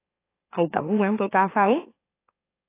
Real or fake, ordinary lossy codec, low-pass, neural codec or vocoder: fake; AAC, 24 kbps; 3.6 kHz; autoencoder, 44.1 kHz, a latent of 192 numbers a frame, MeloTTS